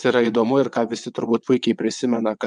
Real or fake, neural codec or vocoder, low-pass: fake; vocoder, 22.05 kHz, 80 mel bands, WaveNeXt; 9.9 kHz